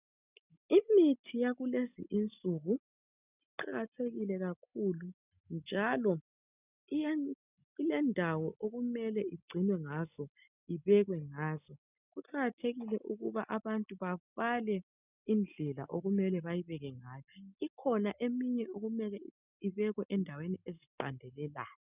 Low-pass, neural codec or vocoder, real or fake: 3.6 kHz; none; real